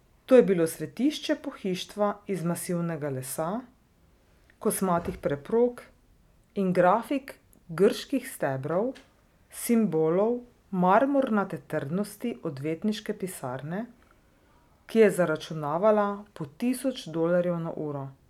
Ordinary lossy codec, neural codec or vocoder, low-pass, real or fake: none; none; 19.8 kHz; real